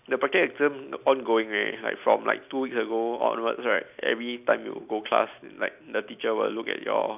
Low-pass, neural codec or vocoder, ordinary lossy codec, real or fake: 3.6 kHz; none; none; real